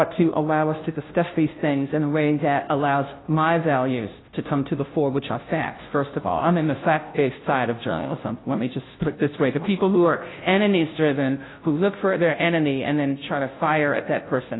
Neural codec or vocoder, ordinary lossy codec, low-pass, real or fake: codec, 16 kHz, 0.5 kbps, FunCodec, trained on Chinese and English, 25 frames a second; AAC, 16 kbps; 7.2 kHz; fake